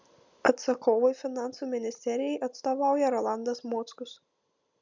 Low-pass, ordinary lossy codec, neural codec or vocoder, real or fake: 7.2 kHz; MP3, 64 kbps; none; real